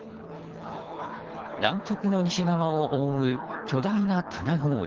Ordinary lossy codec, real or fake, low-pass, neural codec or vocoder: Opus, 16 kbps; fake; 7.2 kHz; codec, 24 kHz, 3 kbps, HILCodec